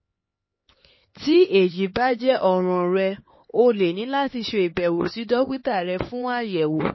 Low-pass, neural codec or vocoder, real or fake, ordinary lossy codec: 7.2 kHz; codec, 16 kHz, 4 kbps, X-Codec, HuBERT features, trained on LibriSpeech; fake; MP3, 24 kbps